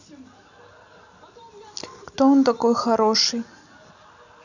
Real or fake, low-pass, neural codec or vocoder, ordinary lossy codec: real; 7.2 kHz; none; none